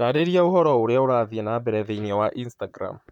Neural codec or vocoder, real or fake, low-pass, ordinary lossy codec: vocoder, 44.1 kHz, 128 mel bands, Pupu-Vocoder; fake; 19.8 kHz; none